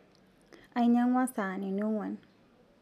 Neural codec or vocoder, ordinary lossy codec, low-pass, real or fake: none; none; 14.4 kHz; real